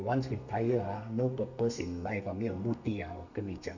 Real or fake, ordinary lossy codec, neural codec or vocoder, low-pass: fake; none; codec, 32 kHz, 1.9 kbps, SNAC; 7.2 kHz